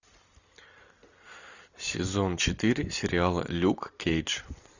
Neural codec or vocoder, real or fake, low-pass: none; real; 7.2 kHz